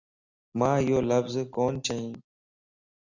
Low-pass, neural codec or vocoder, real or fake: 7.2 kHz; none; real